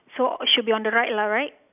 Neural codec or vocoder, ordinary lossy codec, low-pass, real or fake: none; none; 3.6 kHz; real